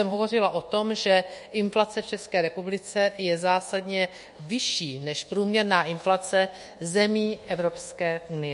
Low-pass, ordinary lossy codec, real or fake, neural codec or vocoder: 10.8 kHz; MP3, 48 kbps; fake; codec, 24 kHz, 1.2 kbps, DualCodec